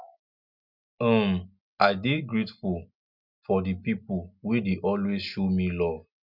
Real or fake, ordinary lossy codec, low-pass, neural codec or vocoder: real; none; 5.4 kHz; none